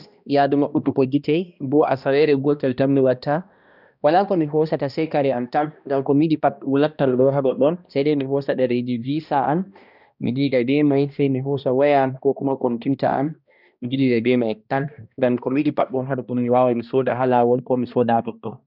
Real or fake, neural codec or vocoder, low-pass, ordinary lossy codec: fake; codec, 16 kHz, 1 kbps, X-Codec, HuBERT features, trained on balanced general audio; 5.4 kHz; none